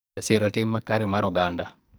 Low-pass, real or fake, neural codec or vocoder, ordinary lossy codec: none; fake; codec, 44.1 kHz, 2.6 kbps, SNAC; none